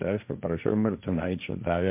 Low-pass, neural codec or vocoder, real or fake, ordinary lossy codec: 3.6 kHz; codec, 16 kHz, 1.1 kbps, Voila-Tokenizer; fake; MP3, 32 kbps